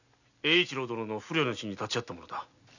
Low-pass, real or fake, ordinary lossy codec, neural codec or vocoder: 7.2 kHz; real; none; none